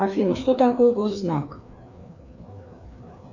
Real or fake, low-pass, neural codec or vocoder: fake; 7.2 kHz; codec, 16 kHz, 2 kbps, FreqCodec, larger model